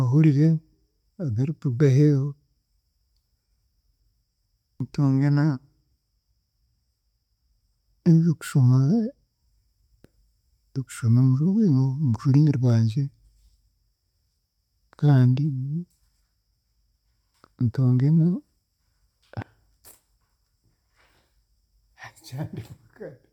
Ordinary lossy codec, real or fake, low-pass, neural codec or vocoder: MP3, 96 kbps; real; 19.8 kHz; none